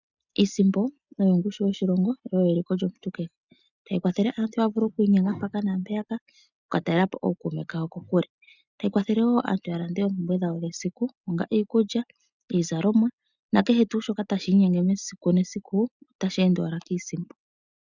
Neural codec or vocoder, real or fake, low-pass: none; real; 7.2 kHz